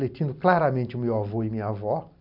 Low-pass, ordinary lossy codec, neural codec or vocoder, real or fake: 5.4 kHz; none; none; real